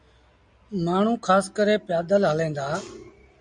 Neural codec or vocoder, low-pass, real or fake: none; 9.9 kHz; real